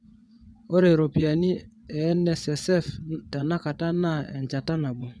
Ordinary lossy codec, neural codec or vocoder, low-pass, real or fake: none; vocoder, 22.05 kHz, 80 mel bands, WaveNeXt; none; fake